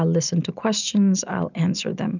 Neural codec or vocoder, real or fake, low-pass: none; real; 7.2 kHz